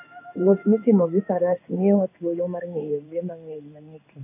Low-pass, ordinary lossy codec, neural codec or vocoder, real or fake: 3.6 kHz; AAC, 32 kbps; codec, 16 kHz in and 24 kHz out, 1 kbps, XY-Tokenizer; fake